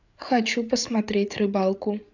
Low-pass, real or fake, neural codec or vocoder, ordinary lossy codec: 7.2 kHz; fake; codec, 16 kHz, 8 kbps, FreqCodec, larger model; none